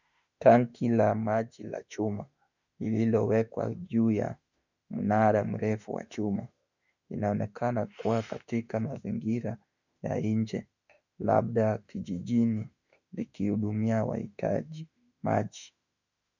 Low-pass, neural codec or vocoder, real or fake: 7.2 kHz; autoencoder, 48 kHz, 32 numbers a frame, DAC-VAE, trained on Japanese speech; fake